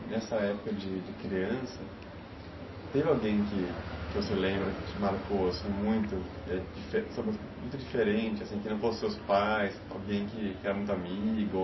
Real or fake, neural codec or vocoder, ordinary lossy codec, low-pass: real; none; MP3, 24 kbps; 7.2 kHz